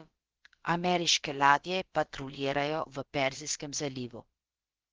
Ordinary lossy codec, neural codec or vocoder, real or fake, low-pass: Opus, 16 kbps; codec, 16 kHz, about 1 kbps, DyCAST, with the encoder's durations; fake; 7.2 kHz